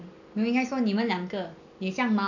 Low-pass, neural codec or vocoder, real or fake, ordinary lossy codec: 7.2 kHz; none; real; none